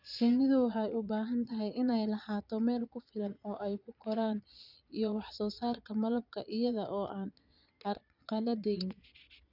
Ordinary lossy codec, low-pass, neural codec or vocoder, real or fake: none; 5.4 kHz; vocoder, 22.05 kHz, 80 mel bands, Vocos; fake